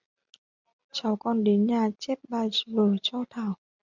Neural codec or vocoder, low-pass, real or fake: none; 7.2 kHz; real